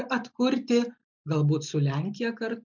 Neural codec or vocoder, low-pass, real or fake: none; 7.2 kHz; real